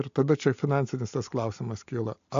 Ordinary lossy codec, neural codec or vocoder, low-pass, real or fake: AAC, 64 kbps; none; 7.2 kHz; real